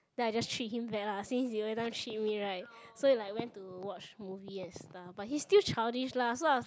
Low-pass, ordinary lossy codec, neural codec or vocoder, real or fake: none; none; none; real